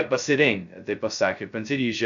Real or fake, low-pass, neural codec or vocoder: fake; 7.2 kHz; codec, 16 kHz, 0.2 kbps, FocalCodec